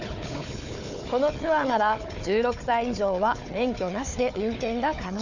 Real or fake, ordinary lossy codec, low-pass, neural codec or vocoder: fake; none; 7.2 kHz; codec, 16 kHz, 4 kbps, FunCodec, trained on Chinese and English, 50 frames a second